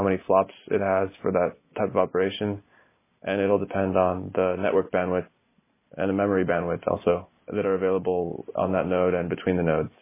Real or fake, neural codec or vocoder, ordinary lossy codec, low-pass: real; none; MP3, 16 kbps; 3.6 kHz